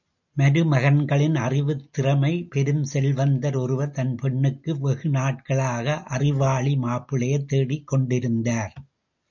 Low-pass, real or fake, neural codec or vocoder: 7.2 kHz; real; none